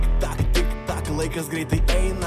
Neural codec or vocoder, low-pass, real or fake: none; 14.4 kHz; real